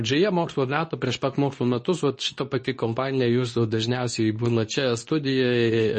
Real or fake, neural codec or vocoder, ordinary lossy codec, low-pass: fake; codec, 24 kHz, 0.9 kbps, WavTokenizer, medium speech release version 2; MP3, 32 kbps; 10.8 kHz